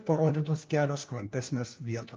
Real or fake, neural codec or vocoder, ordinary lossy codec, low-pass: fake; codec, 16 kHz, 1 kbps, FunCodec, trained on LibriTTS, 50 frames a second; Opus, 24 kbps; 7.2 kHz